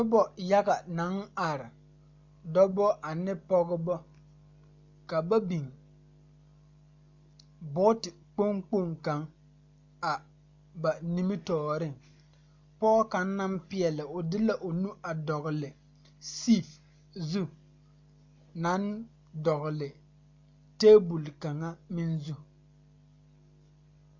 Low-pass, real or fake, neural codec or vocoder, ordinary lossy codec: 7.2 kHz; real; none; MP3, 64 kbps